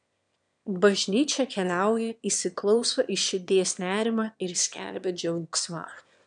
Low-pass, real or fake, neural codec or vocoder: 9.9 kHz; fake; autoencoder, 22.05 kHz, a latent of 192 numbers a frame, VITS, trained on one speaker